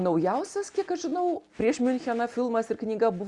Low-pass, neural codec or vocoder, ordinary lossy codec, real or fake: 10.8 kHz; none; Opus, 64 kbps; real